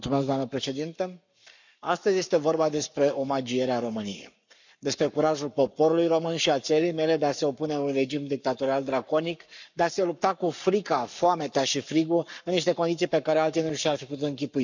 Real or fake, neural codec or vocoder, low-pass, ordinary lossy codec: fake; codec, 44.1 kHz, 7.8 kbps, Pupu-Codec; 7.2 kHz; none